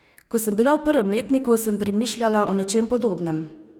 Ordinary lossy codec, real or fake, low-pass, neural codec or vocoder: none; fake; 19.8 kHz; codec, 44.1 kHz, 2.6 kbps, DAC